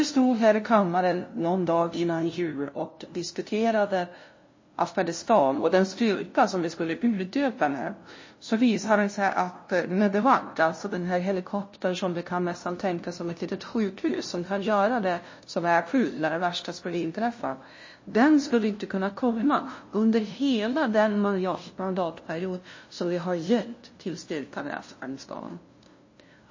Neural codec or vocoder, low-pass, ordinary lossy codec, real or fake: codec, 16 kHz, 0.5 kbps, FunCodec, trained on LibriTTS, 25 frames a second; 7.2 kHz; MP3, 32 kbps; fake